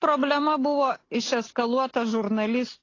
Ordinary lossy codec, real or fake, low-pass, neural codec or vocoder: AAC, 32 kbps; real; 7.2 kHz; none